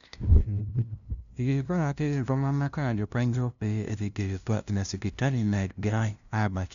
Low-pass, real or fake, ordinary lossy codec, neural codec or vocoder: 7.2 kHz; fake; none; codec, 16 kHz, 0.5 kbps, FunCodec, trained on LibriTTS, 25 frames a second